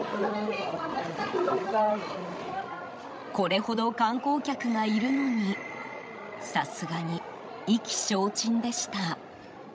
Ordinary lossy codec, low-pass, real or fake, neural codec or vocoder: none; none; fake; codec, 16 kHz, 16 kbps, FreqCodec, larger model